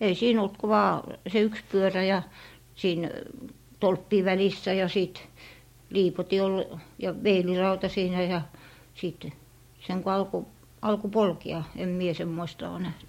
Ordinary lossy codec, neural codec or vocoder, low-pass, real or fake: MP3, 64 kbps; none; 19.8 kHz; real